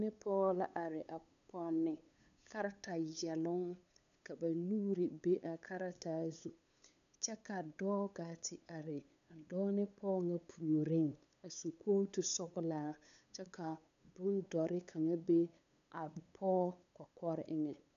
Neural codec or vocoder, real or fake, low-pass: codec, 16 kHz, 8 kbps, FunCodec, trained on LibriTTS, 25 frames a second; fake; 7.2 kHz